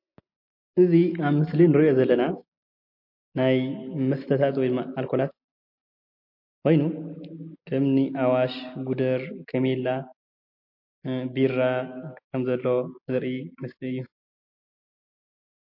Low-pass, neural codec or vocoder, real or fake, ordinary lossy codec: 5.4 kHz; none; real; MP3, 32 kbps